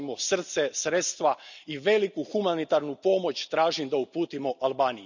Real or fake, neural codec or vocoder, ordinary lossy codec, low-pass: real; none; none; 7.2 kHz